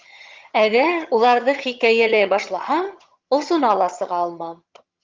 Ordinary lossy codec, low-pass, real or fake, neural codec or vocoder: Opus, 24 kbps; 7.2 kHz; fake; vocoder, 22.05 kHz, 80 mel bands, HiFi-GAN